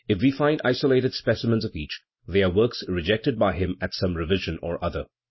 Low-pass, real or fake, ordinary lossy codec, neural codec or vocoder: 7.2 kHz; real; MP3, 24 kbps; none